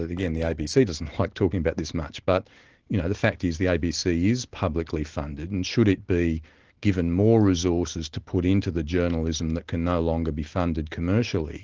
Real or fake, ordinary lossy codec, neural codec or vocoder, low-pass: real; Opus, 16 kbps; none; 7.2 kHz